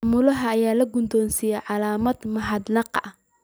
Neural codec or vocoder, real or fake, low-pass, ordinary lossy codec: none; real; none; none